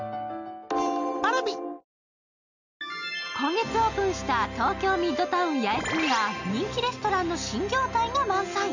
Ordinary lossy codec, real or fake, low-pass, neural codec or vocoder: none; real; 7.2 kHz; none